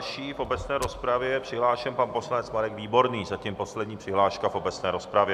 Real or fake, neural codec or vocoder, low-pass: real; none; 14.4 kHz